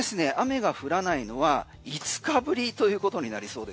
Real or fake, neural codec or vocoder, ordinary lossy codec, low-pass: real; none; none; none